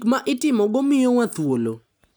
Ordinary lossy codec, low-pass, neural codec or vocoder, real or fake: none; none; none; real